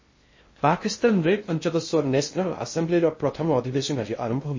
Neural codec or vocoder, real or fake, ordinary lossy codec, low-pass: codec, 16 kHz in and 24 kHz out, 0.6 kbps, FocalCodec, streaming, 2048 codes; fake; MP3, 32 kbps; 7.2 kHz